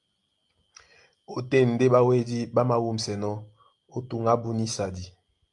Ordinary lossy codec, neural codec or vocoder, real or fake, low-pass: Opus, 32 kbps; none; real; 10.8 kHz